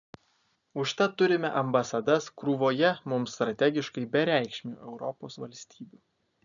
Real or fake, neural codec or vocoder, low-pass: real; none; 7.2 kHz